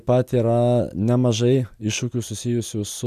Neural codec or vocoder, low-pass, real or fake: none; 14.4 kHz; real